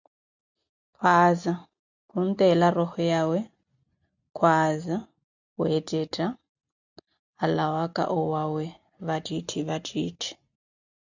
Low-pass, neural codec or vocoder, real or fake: 7.2 kHz; none; real